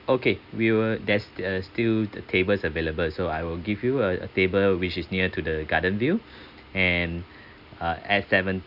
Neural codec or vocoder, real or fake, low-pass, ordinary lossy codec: none; real; 5.4 kHz; Opus, 64 kbps